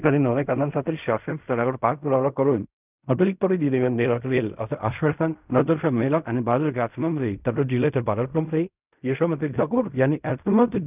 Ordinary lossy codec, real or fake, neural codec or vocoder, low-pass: none; fake; codec, 16 kHz in and 24 kHz out, 0.4 kbps, LongCat-Audio-Codec, fine tuned four codebook decoder; 3.6 kHz